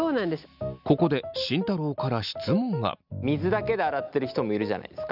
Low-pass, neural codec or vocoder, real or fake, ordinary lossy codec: 5.4 kHz; none; real; none